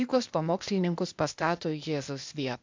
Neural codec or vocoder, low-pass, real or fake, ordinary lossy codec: codec, 16 kHz, 0.8 kbps, ZipCodec; 7.2 kHz; fake; MP3, 64 kbps